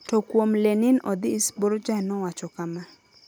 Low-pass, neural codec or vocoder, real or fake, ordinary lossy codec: none; none; real; none